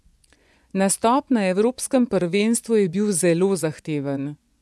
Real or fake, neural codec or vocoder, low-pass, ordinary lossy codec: fake; vocoder, 24 kHz, 100 mel bands, Vocos; none; none